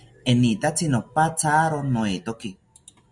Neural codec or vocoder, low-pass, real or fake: none; 10.8 kHz; real